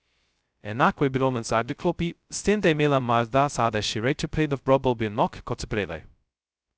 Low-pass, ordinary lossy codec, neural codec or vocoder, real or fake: none; none; codec, 16 kHz, 0.2 kbps, FocalCodec; fake